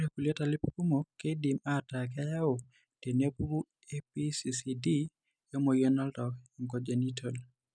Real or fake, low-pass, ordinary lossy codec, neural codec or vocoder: real; 9.9 kHz; none; none